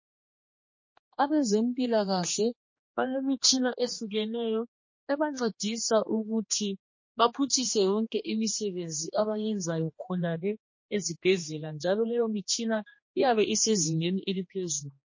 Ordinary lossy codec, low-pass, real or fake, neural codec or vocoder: MP3, 32 kbps; 7.2 kHz; fake; codec, 16 kHz, 2 kbps, X-Codec, HuBERT features, trained on general audio